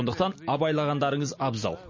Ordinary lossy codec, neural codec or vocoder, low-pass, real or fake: MP3, 32 kbps; none; 7.2 kHz; real